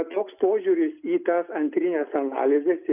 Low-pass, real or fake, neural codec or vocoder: 3.6 kHz; real; none